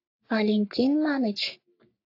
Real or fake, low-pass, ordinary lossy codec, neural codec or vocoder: fake; 5.4 kHz; AAC, 32 kbps; codec, 44.1 kHz, 3.4 kbps, Pupu-Codec